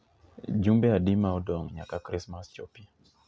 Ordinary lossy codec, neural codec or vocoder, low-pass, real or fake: none; none; none; real